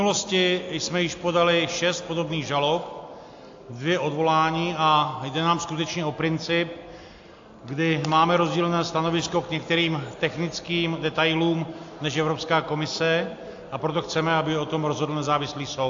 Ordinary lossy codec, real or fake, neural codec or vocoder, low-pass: AAC, 48 kbps; real; none; 7.2 kHz